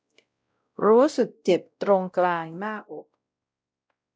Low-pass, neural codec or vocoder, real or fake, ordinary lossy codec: none; codec, 16 kHz, 0.5 kbps, X-Codec, WavLM features, trained on Multilingual LibriSpeech; fake; none